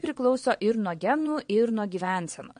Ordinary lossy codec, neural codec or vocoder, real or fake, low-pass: MP3, 48 kbps; vocoder, 22.05 kHz, 80 mel bands, WaveNeXt; fake; 9.9 kHz